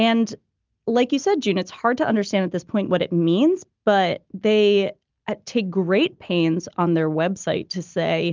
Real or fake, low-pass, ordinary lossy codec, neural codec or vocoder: real; 7.2 kHz; Opus, 24 kbps; none